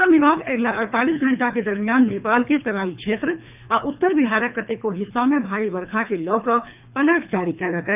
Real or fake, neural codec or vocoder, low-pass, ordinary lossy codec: fake; codec, 24 kHz, 3 kbps, HILCodec; 3.6 kHz; none